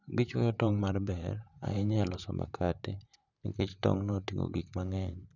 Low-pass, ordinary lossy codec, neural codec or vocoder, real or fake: 7.2 kHz; none; vocoder, 44.1 kHz, 128 mel bands, Pupu-Vocoder; fake